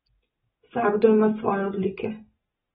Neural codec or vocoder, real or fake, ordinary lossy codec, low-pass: none; real; AAC, 16 kbps; 7.2 kHz